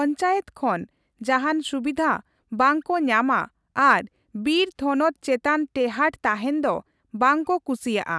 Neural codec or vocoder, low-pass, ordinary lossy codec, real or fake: none; none; none; real